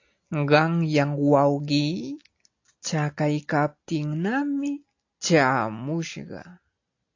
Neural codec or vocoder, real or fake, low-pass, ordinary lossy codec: none; real; 7.2 kHz; AAC, 48 kbps